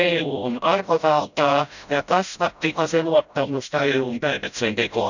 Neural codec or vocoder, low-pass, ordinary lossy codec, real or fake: codec, 16 kHz, 0.5 kbps, FreqCodec, smaller model; 7.2 kHz; none; fake